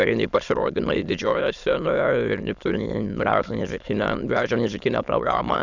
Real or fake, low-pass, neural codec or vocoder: fake; 7.2 kHz; autoencoder, 22.05 kHz, a latent of 192 numbers a frame, VITS, trained on many speakers